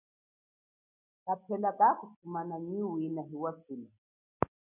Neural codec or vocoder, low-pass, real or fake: none; 3.6 kHz; real